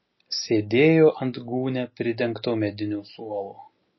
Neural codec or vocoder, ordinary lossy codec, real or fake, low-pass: none; MP3, 24 kbps; real; 7.2 kHz